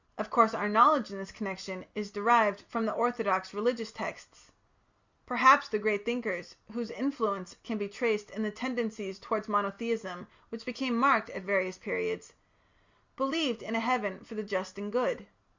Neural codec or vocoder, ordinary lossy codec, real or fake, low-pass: none; Opus, 64 kbps; real; 7.2 kHz